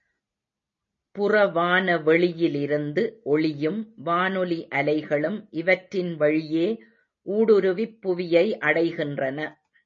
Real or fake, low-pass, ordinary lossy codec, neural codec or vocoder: real; 7.2 kHz; MP3, 32 kbps; none